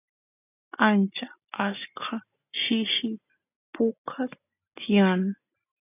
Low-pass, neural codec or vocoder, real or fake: 3.6 kHz; none; real